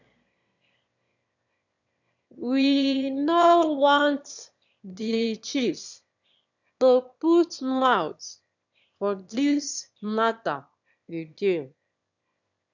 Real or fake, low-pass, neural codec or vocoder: fake; 7.2 kHz; autoencoder, 22.05 kHz, a latent of 192 numbers a frame, VITS, trained on one speaker